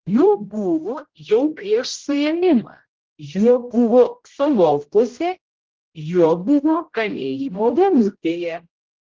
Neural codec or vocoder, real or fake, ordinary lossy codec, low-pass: codec, 16 kHz, 0.5 kbps, X-Codec, HuBERT features, trained on general audio; fake; Opus, 16 kbps; 7.2 kHz